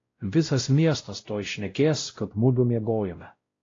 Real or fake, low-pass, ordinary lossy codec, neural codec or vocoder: fake; 7.2 kHz; AAC, 32 kbps; codec, 16 kHz, 0.5 kbps, X-Codec, WavLM features, trained on Multilingual LibriSpeech